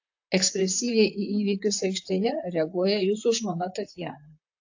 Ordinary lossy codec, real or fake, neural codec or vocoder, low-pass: AAC, 48 kbps; fake; vocoder, 44.1 kHz, 128 mel bands, Pupu-Vocoder; 7.2 kHz